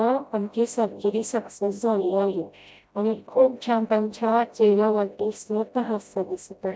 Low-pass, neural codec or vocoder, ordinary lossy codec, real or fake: none; codec, 16 kHz, 0.5 kbps, FreqCodec, smaller model; none; fake